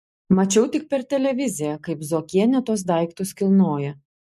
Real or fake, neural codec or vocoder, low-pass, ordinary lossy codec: real; none; 14.4 kHz; MP3, 64 kbps